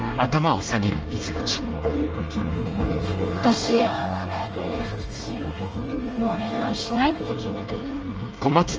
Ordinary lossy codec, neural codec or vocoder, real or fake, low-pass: Opus, 24 kbps; codec, 24 kHz, 1 kbps, SNAC; fake; 7.2 kHz